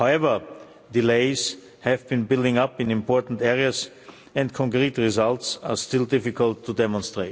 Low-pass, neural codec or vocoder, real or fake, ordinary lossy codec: none; none; real; none